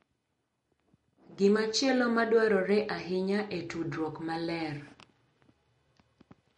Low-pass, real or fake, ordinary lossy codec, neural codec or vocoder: 19.8 kHz; real; MP3, 48 kbps; none